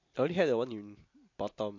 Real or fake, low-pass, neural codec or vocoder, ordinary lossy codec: real; 7.2 kHz; none; MP3, 48 kbps